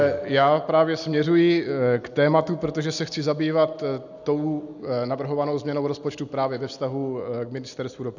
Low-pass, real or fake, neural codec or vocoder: 7.2 kHz; real; none